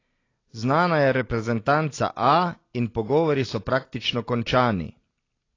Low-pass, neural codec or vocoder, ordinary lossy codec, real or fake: 7.2 kHz; none; AAC, 32 kbps; real